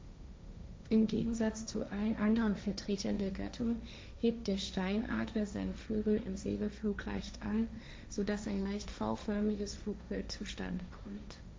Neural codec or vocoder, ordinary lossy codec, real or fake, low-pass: codec, 16 kHz, 1.1 kbps, Voila-Tokenizer; none; fake; none